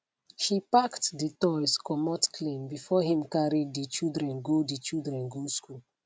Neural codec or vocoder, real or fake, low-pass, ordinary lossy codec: none; real; none; none